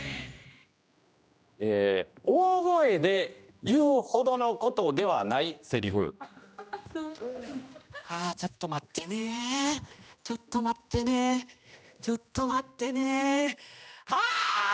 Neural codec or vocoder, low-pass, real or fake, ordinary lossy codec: codec, 16 kHz, 1 kbps, X-Codec, HuBERT features, trained on general audio; none; fake; none